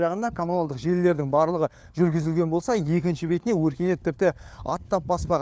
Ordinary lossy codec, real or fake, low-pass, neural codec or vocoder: none; fake; none; codec, 16 kHz, 4 kbps, FunCodec, trained on LibriTTS, 50 frames a second